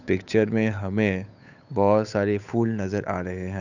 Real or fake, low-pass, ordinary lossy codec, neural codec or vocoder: fake; 7.2 kHz; none; codec, 16 kHz, 8 kbps, FunCodec, trained on Chinese and English, 25 frames a second